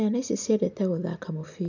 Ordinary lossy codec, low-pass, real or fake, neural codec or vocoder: none; 7.2 kHz; real; none